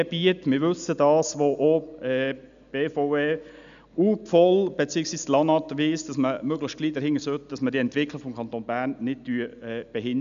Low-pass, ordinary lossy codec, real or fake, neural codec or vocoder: 7.2 kHz; none; real; none